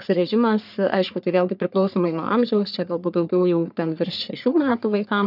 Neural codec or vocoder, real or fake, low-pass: codec, 44.1 kHz, 3.4 kbps, Pupu-Codec; fake; 5.4 kHz